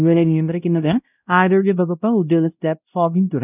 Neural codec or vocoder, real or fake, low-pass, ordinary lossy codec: codec, 16 kHz, 0.5 kbps, X-Codec, WavLM features, trained on Multilingual LibriSpeech; fake; 3.6 kHz; none